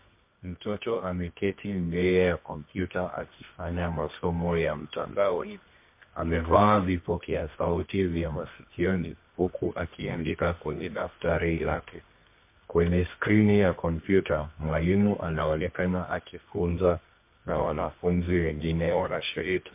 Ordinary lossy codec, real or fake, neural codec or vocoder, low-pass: MP3, 24 kbps; fake; codec, 24 kHz, 0.9 kbps, WavTokenizer, medium music audio release; 3.6 kHz